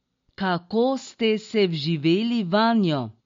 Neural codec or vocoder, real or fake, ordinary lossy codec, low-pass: none; real; MP3, 48 kbps; 7.2 kHz